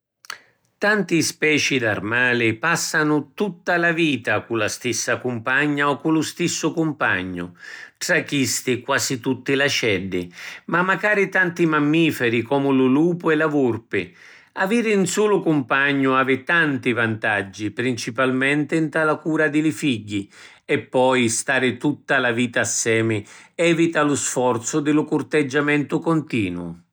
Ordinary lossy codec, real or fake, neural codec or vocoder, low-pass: none; real; none; none